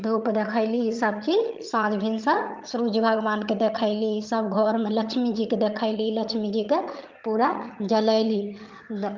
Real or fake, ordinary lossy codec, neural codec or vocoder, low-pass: fake; Opus, 24 kbps; vocoder, 22.05 kHz, 80 mel bands, HiFi-GAN; 7.2 kHz